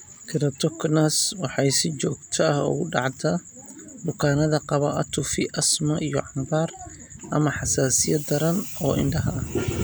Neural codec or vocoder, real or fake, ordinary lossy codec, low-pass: none; real; none; none